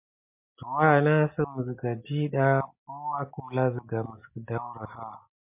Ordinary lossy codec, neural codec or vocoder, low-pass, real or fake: MP3, 32 kbps; none; 3.6 kHz; real